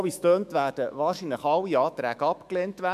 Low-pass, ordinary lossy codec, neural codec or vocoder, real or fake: 14.4 kHz; MP3, 96 kbps; autoencoder, 48 kHz, 128 numbers a frame, DAC-VAE, trained on Japanese speech; fake